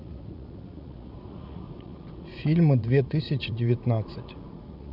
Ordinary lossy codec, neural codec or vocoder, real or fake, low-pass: none; vocoder, 44.1 kHz, 80 mel bands, Vocos; fake; 5.4 kHz